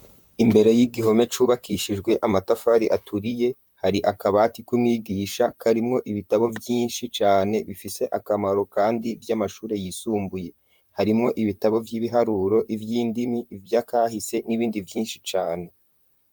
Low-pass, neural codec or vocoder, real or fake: 19.8 kHz; vocoder, 44.1 kHz, 128 mel bands, Pupu-Vocoder; fake